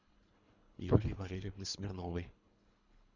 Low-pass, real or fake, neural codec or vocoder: 7.2 kHz; fake; codec, 24 kHz, 3 kbps, HILCodec